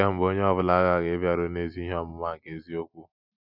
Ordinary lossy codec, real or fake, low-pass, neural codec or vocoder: none; real; 5.4 kHz; none